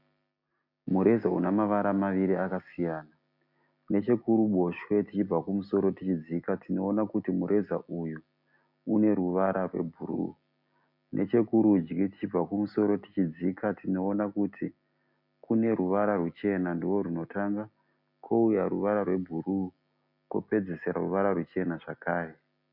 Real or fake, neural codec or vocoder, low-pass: real; none; 5.4 kHz